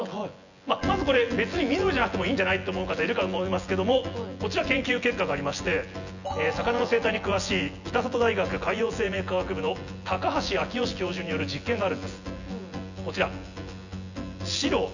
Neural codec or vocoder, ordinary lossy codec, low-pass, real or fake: vocoder, 24 kHz, 100 mel bands, Vocos; none; 7.2 kHz; fake